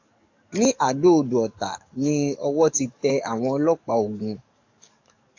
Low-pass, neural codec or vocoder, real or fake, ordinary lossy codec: 7.2 kHz; codec, 44.1 kHz, 7.8 kbps, DAC; fake; AAC, 48 kbps